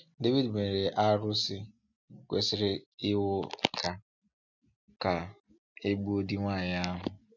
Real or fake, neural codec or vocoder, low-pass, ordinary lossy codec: real; none; 7.2 kHz; none